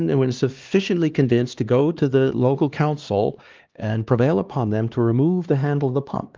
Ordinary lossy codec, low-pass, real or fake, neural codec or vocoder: Opus, 32 kbps; 7.2 kHz; fake; codec, 16 kHz, 2 kbps, X-Codec, HuBERT features, trained on LibriSpeech